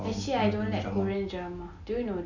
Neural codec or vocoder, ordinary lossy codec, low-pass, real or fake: none; none; 7.2 kHz; real